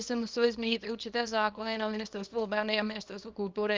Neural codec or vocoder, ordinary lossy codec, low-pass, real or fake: codec, 24 kHz, 0.9 kbps, WavTokenizer, small release; Opus, 24 kbps; 7.2 kHz; fake